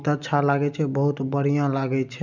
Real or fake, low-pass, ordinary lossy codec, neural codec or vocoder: real; 7.2 kHz; none; none